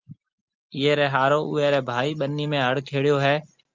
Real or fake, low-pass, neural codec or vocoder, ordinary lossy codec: real; 7.2 kHz; none; Opus, 24 kbps